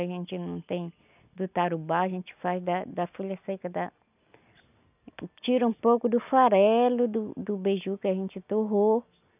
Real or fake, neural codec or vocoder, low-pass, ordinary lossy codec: real; none; 3.6 kHz; none